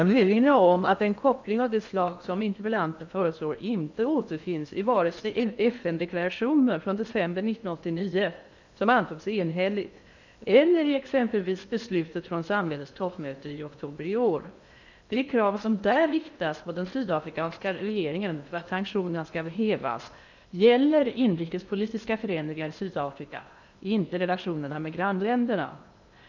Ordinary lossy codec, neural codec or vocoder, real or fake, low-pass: none; codec, 16 kHz in and 24 kHz out, 0.8 kbps, FocalCodec, streaming, 65536 codes; fake; 7.2 kHz